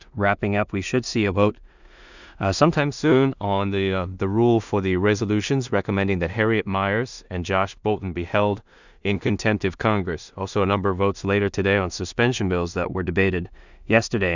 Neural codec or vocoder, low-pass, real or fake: codec, 16 kHz in and 24 kHz out, 0.4 kbps, LongCat-Audio-Codec, two codebook decoder; 7.2 kHz; fake